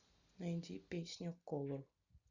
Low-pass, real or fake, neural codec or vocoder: 7.2 kHz; real; none